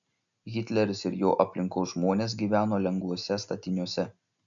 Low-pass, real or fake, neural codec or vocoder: 7.2 kHz; real; none